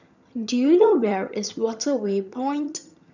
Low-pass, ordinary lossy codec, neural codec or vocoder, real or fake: 7.2 kHz; none; vocoder, 22.05 kHz, 80 mel bands, HiFi-GAN; fake